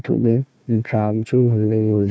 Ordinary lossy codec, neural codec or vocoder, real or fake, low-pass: none; codec, 16 kHz, 1 kbps, FunCodec, trained on Chinese and English, 50 frames a second; fake; none